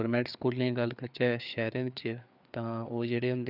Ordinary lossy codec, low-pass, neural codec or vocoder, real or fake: none; 5.4 kHz; codec, 16 kHz, 4 kbps, FreqCodec, larger model; fake